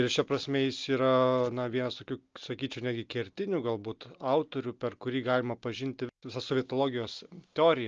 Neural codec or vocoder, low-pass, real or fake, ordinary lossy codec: none; 7.2 kHz; real; Opus, 24 kbps